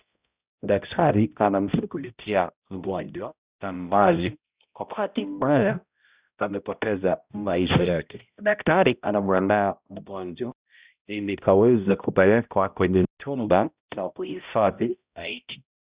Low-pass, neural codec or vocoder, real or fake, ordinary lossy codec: 3.6 kHz; codec, 16 kHz, 0.5 kbps, X-Codec, HuBERT features, trained on balanced general audio; fake; Opus, 64 kbps